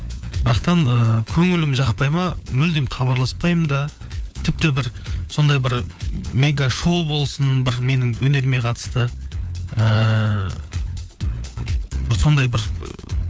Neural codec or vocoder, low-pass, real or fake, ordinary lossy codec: codec, 16 kHz, 4 kbps, FunCodec, trained on LibriTTS, 50 frames a second; none; fake; none